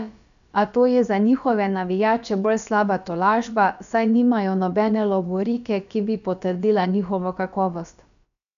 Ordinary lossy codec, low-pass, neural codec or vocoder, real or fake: none; 7.2 kHz; codec, 16 kHz, about 1 kbps, DyCAST, with the encoder's durations; fake